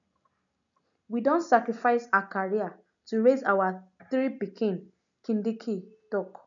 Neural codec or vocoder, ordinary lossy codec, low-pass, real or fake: none; none; 7.2 kHz; real